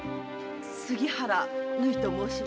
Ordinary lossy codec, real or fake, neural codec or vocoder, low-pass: none; real; none; none